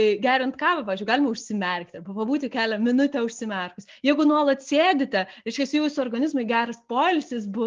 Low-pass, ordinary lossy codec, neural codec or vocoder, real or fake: 7.2 kHz; Opus, 32 kbps; none; real